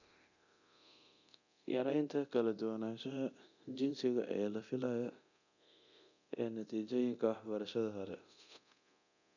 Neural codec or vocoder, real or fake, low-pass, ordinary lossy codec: codec, 24 kHz, 0.9 kbps, DualCodec; fake; 7.2 kHz; none